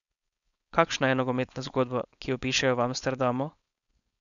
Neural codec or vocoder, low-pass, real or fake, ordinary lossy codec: codec, 16 kHz, 4.8 kbps, FACodec; 7.2 kHz; fake; none